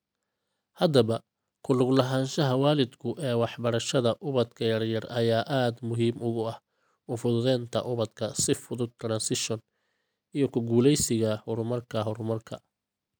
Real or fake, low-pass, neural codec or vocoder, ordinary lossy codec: real; none; none; none